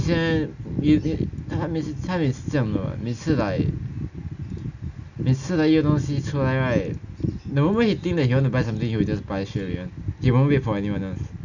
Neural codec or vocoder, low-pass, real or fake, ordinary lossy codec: none; 7.2 kHz; real; none